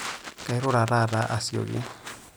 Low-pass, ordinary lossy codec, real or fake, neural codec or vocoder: none; none; real; none